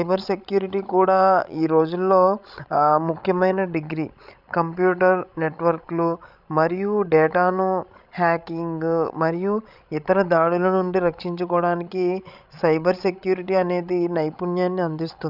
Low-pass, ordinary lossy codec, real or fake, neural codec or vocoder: 5.4 kHz; none; fake; codec, 16 kHz, 16 kbps, FreqCodec, larger model